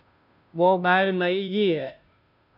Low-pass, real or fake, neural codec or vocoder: 5.4 kHz; fake; codec, 16 kHz, 0.5 kbps, FunCodec, trained on Chinese and English, 25 frames a second